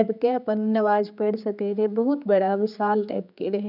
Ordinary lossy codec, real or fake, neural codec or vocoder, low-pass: none; fake; codec, 16 kHz, 4 kbps, X-Codec, HuBERT features, trained on general audio; 5.4 kHz